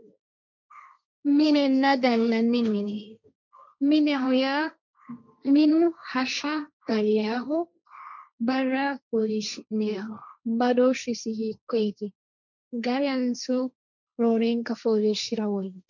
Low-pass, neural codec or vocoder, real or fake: 7.2 kHz; codec, 16 kHz, 1.1 kbps, Voila-Tokenizer; fake